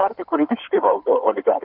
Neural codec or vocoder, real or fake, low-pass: codec, 16 kHz in and 24 kHz out, 2.2 kbps, FireRedTTS-2 codec; fake; 5.4 kHz